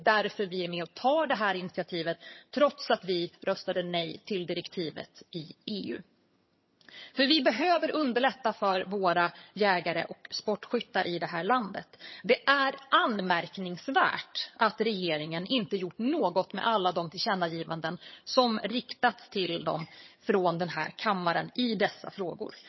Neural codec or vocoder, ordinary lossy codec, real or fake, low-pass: vocoder, 22.05 kHz, 80 mel bands, HiFi-GAN; MP3, 24 kbps; fake; 7.2 kHz